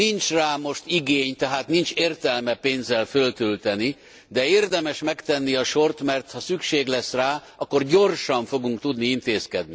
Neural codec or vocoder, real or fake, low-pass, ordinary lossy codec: none; real; none; none